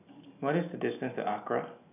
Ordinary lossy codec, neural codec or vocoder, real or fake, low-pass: none; none; real; 3.6 kHz